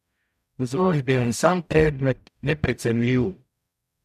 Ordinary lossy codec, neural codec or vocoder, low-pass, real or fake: none; codec, 44.1 kHz, 0.9 kbps, DAC; 14.4 kHz; fake